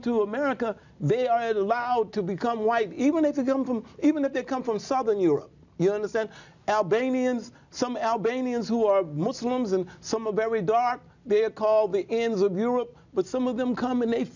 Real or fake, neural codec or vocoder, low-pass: real; none; 7.2 kHz